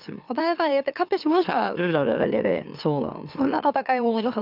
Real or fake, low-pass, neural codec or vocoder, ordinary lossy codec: fake; 5.4 kHz; autoencoder, 44.1 kHz, a latent of 192 numbers a frame, MeloTTS; none